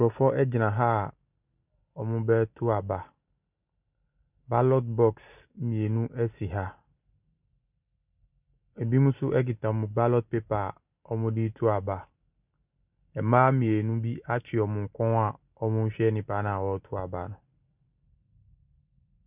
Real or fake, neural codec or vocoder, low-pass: real; none; 3.6 kHz